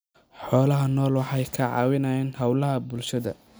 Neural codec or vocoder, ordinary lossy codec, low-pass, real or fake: none; none; none; real